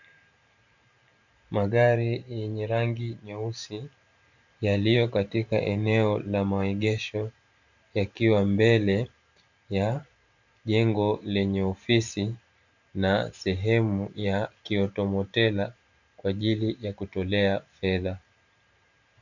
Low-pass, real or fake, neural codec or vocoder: 7.2 kHz; fake; vocoder, 44.1 kHz, 128 mel bands every 512 samples, BigVGAN v2